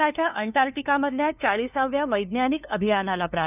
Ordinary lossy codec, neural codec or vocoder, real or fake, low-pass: none; codec, 16 kHz, 2 kbps, FunCodec, trained on LibriTTS, 25 frames a second; fake; 3.6 kHz